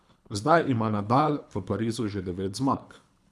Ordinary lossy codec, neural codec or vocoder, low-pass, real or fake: none; codec, 24 kHz, 3 kbps, HILCodec; none; fake